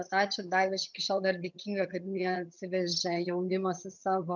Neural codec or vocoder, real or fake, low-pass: vocoder, 22.05 kHz, 80 mel bands, HiFi-GAN; fake; 7.2 kHz